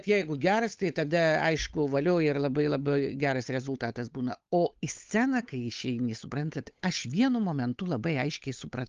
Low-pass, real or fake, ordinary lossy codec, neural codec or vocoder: 7.2 kHz; fake; Opus, 32 kbps; codec, 16 kHz, 8 kbps, FunCodec, trained on Chinese and English, 25 frames a second